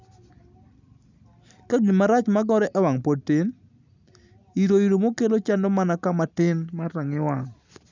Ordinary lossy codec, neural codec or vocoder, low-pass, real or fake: none; none; 7.2 kHz; real